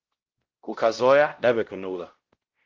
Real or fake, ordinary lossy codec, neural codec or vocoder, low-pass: fake; Opus, 16 kbps; codec, 16 kHz, 0.5 kbps, X-Codec, WavLM features, trained on Multilingual LibriSpeech; 7.2 kHz